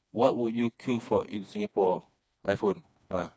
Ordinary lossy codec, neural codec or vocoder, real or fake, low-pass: none; codec, 16 kHz, 2 kbps, FreqCodec, smaller model; fake; none